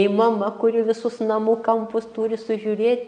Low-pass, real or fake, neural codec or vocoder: 9.9 kHz; real; none